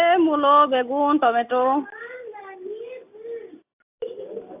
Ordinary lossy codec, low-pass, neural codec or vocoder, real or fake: AAC, 32 kbps; 3.6 kHz; none; real